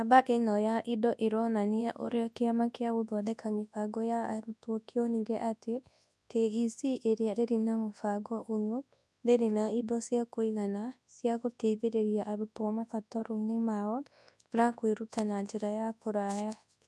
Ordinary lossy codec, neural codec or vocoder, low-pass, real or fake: none; codec, 24 kHz, 0.9 kbps, WavTokenizer, large speech release; none; fake